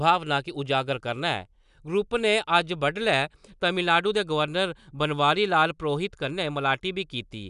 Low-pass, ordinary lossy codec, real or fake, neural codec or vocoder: 10.8 kHz; none; real; none